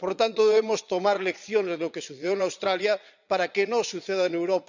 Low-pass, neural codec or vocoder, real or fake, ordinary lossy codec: 7.2 kHz; vocoder, 22.05 kHz, 80 mel bands, Vocos; fake; none